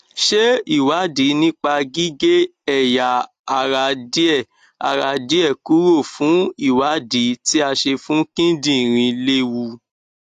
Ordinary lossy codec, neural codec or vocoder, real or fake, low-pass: AAC, 64 kbps; none; real; 14.4 kHz